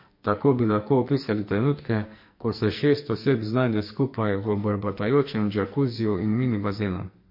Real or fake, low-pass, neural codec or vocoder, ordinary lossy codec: fake; 5.4 kHz; codec, 44.1 kHz, 2.6 kbps, SNAC; MP3, 32 kbps